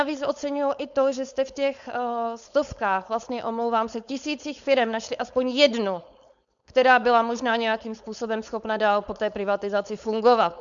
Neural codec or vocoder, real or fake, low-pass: codec, 16 kHz, 4.8 kbps, FACodec; fake; 7.2 kHz